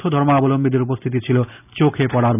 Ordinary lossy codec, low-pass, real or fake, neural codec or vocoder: none; 3.6 kHz; real; none